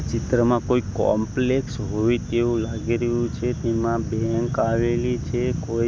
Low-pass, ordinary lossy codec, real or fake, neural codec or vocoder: none; none; real; none